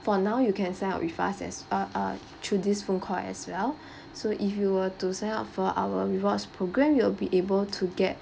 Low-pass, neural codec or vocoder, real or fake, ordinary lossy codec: none; none; real; none